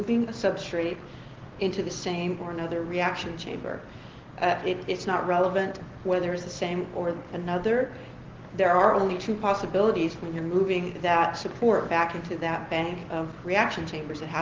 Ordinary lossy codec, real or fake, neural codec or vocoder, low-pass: Opus, 16 kbps; real; none; 7.2 kHz